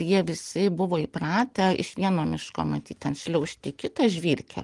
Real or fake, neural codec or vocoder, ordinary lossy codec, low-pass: real; none; Opus, 24 kbps; 10.8 kHz